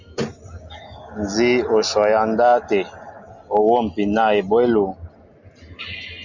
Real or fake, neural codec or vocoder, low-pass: real; none; 7.2 kHz